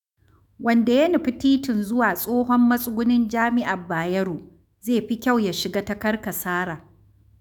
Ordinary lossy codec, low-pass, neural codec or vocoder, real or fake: none; 19.8 kHz; autoencoder, 48 kHz, 128 numbers a frame, DAC-VAE, trained on Japanese speech; fake